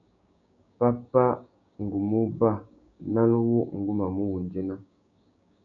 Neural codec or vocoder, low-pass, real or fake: codec, 16 kHz, 6 kbps, DAC; 7.2 kHz; fake